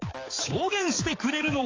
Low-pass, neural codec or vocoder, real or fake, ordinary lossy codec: 7.2 kHz; codec, 16 kHz, 4 kbps, X-Codec, HuBERT features, trained on balanced general audio; fake; AAC, 32 kbps